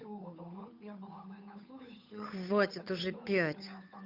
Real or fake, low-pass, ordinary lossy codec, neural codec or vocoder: fake; 5.4 kHz; none; codec, 16 kHz, 8 kbps, FunCodec, trained on Chinese and English, 25 frames a second